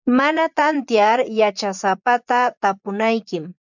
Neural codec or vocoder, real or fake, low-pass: vocoder, 24 kHz, 100 mel bands, Vocos; fake; 7.2 kHz